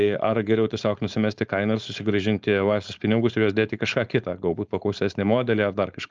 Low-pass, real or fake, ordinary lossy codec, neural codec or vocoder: 7.2 kHz; fake; Opus, 24 kbps; codec, 16 kHz, 4.8 kbps, FACodec